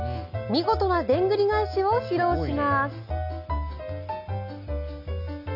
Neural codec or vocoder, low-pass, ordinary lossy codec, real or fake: none; 5.4 kHz; none; real